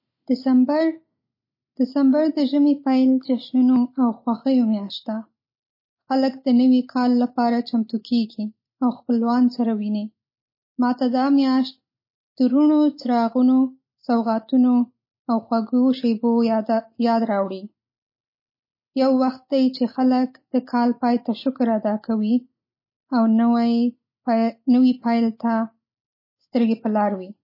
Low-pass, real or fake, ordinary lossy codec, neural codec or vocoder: 5.4 kHz; real; MP3, 24 kbps; none